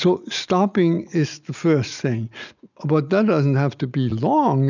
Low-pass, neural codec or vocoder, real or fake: 7.2 kHz; none; real